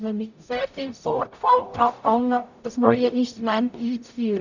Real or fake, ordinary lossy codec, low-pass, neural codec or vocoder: fake; none; 7.2 kHz; codec, 44.1 kHz, 0.9 kbps, DAC